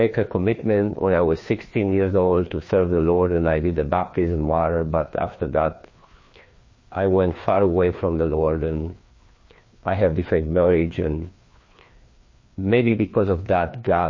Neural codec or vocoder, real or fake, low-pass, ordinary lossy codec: codec, 16 kHz, 2 kbps, FreqCodec, larger model; fake; 7.2 kHz; MP3, 32 kbps